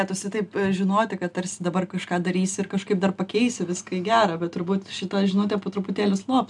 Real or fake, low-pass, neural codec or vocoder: real; 10.8 kHz; none